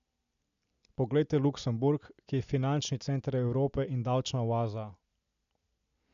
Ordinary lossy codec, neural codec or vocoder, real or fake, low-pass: none; none; real; 7.2 kHz